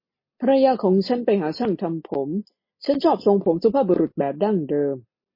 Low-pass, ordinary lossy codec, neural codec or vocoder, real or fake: 5.4 kHz; MP3, 24 kbps; none; real